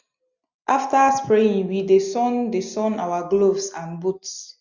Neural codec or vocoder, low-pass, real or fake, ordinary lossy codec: none; 7.2 kHz; real; none